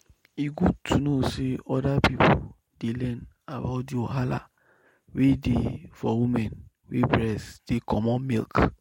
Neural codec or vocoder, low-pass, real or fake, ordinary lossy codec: none; 19.8 kHz; real; MP3, 64 kbps